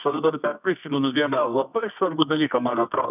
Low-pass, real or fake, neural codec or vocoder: 3.6 kHz; fake; codec, 24 kHz, 0.9 kbps, WavTokenizer, medium music audio release